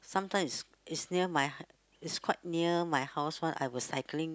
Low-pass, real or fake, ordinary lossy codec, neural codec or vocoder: none; real; none; none